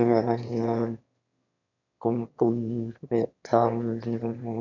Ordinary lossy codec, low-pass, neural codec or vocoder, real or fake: none; 7.2 kHz; autoencoder, 22.05 kHz, a latent of 192 numbers a frame, VITS, trained on one speaker; fake